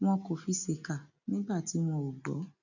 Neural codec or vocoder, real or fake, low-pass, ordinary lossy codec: none; real; 7.2 kHz; none